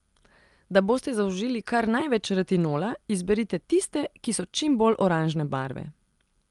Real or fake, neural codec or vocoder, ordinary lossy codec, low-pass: real; none; Opus, 32 kbps; 10.8 kHz